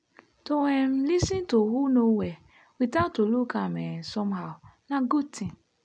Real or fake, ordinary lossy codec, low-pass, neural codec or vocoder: real; none; 9.9 kHz; none